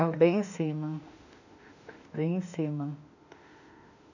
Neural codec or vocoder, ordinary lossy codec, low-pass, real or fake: autoencoder, 48 kHz, 32 numbers a frame, DAC-VAE, trained on Japanese speech; none; 7.2 kHz; fake